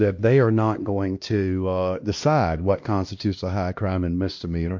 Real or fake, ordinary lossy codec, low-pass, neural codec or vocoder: fake; MP3, 48 kbps; 7.2 kHz; codec, 16 kHz, 1 kbps, X-Codec, HuBERT features, trained on LibriSpeech